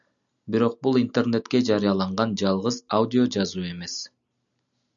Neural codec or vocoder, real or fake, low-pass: none; real; 7.2 kHz